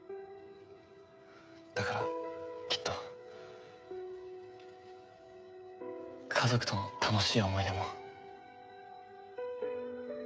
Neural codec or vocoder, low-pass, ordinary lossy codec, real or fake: codec, 16 kHz, 16 kbps, FreqCodec, smaller model; none; none; fake